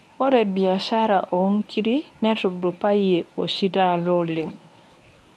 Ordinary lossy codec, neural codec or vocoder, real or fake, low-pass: none; codec, 24 kHz, 0.9 kbps, WavTokenizer, medium speech release version 1; fake; none